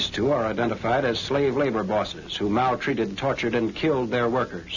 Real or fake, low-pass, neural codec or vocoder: real; 7.2 kHz; none